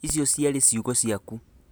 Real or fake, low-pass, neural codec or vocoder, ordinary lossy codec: real; none; none; none